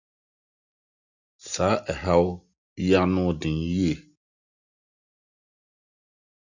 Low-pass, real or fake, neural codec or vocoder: 7.2 kHz; real; none